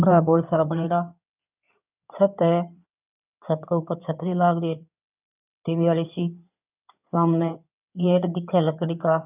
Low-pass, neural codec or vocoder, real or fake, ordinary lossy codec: 3.6 kHz; codec, 16 kHz in and 24 kHz out, 2.2 kbps, FireRedTTS-2 codec; fake; none